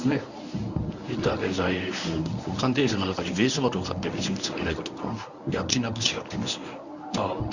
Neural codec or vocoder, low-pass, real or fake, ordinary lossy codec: codec, 24 kHz, 0.9 kbps, WavTokenizer, medium speech release version 1; 7.2 kHz; fake; none